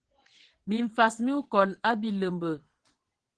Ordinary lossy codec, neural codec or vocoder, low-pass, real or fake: Opus, 16 kbps; vocoder, 22.05 kHz, 80 mel bands, WaveNeXt; 9.9 kHz; fake